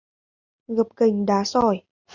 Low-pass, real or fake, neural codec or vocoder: 7.2 kHz; real; none